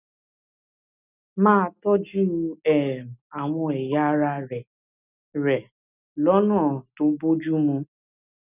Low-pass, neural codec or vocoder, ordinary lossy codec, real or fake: 3.6 kHz; none; none; real